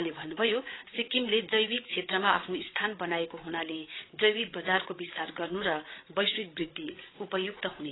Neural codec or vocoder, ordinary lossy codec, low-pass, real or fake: codec, 16 kHz, 8 kbps, FreqCodec, larger model; AAC, 16 kbps; 7.2 kHz; fake